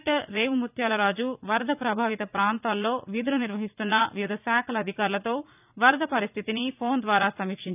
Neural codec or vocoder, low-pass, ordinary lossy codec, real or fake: vocoder, 44.1 kHz, 80 mel bands, Vocos; 3.6 kHz; none; fake